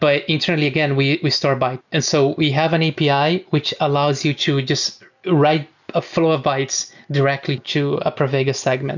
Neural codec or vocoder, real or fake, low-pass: none; real; 7.2 kHz